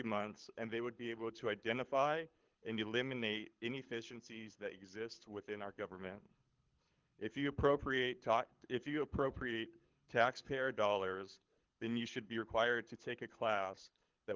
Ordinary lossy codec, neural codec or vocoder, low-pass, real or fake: Opus, 32 kbps; codec, 24 kHz, 6 kbps, HILCodec; 7.2 kHz; fake